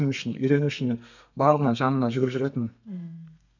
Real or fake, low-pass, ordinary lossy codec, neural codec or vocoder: fake; 7.2 kHz; none; codec, 32 kHz, 1.9 kbps, SNAC